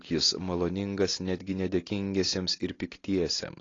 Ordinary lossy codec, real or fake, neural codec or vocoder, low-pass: AAC, 32 kbps; real; none; 7.2 kHz